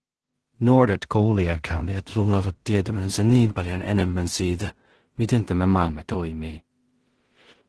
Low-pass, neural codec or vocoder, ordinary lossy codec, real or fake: 10.8 kHz; codec, 16 kHz in and 24 kHz out, 0.4 kbps, LongCat-Audio-Codec, two codebook decoder; Opus, 16 kbps; fake